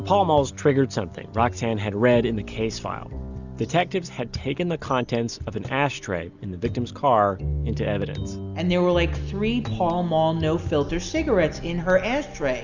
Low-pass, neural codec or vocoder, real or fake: 7.2 kHz; none; real